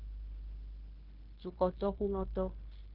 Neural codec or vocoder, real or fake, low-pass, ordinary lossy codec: codec, 16 kHz, 0.9 kbps, LongCat-Audio-Codec; fake; 5.4 kHz; Opus, 16 kbps